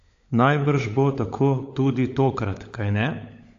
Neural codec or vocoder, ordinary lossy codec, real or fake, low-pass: codec, 16 kHz, 16 kbps, FunCodec, trained on LibriTTS, 50 frames a second; AAC, 48 kbps; fake; 7.2 kHz